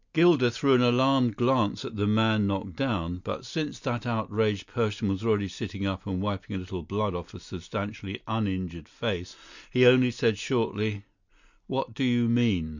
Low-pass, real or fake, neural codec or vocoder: 7.2 kHz; real; none